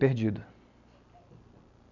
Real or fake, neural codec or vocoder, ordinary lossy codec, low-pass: real; none; none; 7.2 kHz